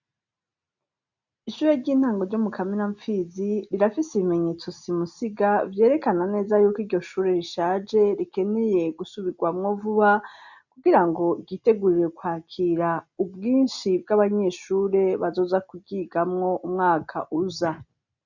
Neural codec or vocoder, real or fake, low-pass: none; real; 7.2 kHz